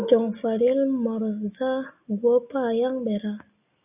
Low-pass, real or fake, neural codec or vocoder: 3.6 kHz; real; none